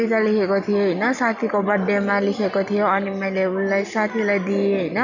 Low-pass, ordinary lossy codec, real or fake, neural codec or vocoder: 7.2 kHz; none; real; none